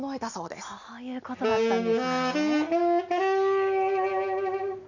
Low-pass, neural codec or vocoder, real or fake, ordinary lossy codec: 7.2 kHz; none; real; none